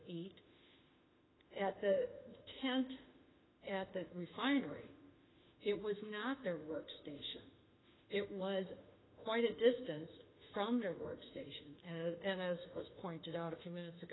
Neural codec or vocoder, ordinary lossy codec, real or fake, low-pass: autoencoder, 48 kHz, 32 numbers a frame, DAC-VAE, trained on Japanese speech; AAC, 16 kbps; fake; 7.2 kHz